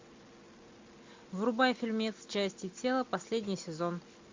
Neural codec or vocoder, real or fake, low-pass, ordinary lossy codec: none; real; 7.2 kHz; MP3, 64 kbps